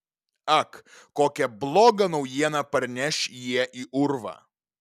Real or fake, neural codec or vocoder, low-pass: real; none; 14.4 kHz